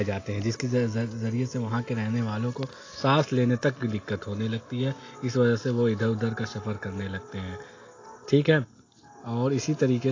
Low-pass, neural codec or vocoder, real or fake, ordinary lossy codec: 7.2 kHz; none; real; AAC, 32 kbps